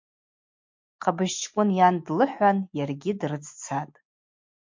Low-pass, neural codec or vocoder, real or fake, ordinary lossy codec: 7.2 kHz; none; real; MP3, 48 kbps